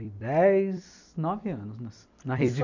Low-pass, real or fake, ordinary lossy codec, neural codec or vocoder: 7.2 kHz; fake; none; vocoder, 44.1 kHz, 128 mel bands every 256 samples, BigVGAN v2